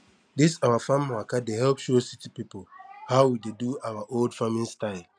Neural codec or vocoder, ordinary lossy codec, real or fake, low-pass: none; none; real; 9.9 kHz